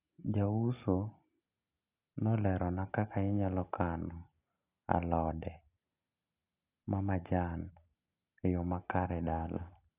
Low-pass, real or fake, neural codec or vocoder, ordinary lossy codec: 3.6 kHz; real; none; none